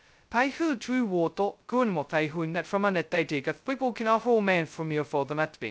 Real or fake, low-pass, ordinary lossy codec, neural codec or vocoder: fake; none; none; codec, 16 kHz, 0.2 kbps, FocalCodec